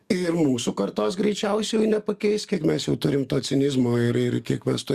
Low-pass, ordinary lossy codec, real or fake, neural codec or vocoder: 14.4 kHz; Opus, 64 kbps; fake; codec, 44.1 kHz, 7.8 kbps, DAC